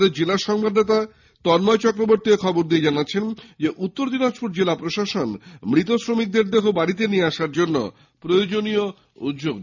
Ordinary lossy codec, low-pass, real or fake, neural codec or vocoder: none; 7.2 kHz; real; none